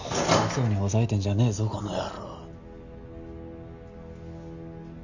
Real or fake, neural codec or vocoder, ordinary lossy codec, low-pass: real; none; none; 7.2 kHz